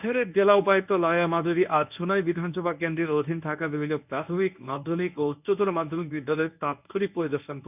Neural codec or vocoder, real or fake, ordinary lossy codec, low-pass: codec, 24 kHz, 0.9 kbps, WavTokenizer, medium speech release version 1; fake; none; 3.6 kHz